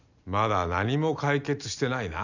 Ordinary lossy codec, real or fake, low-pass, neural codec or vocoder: none; real; 7.2 kHz; none